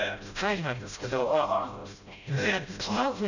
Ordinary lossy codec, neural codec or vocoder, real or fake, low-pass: none; codec, 16 kHz, 0.5 kbps, FreqCodec, smaller model; fake; 7.2 kHz